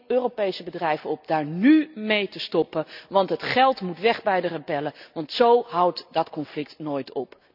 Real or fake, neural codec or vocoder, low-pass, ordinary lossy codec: real; none; 5.4 kHz; none